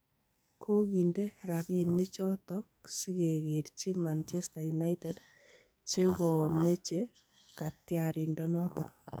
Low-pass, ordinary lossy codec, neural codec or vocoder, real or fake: none; none; codec, 44.1 kHz, 2.6 kbps, SNAC; fake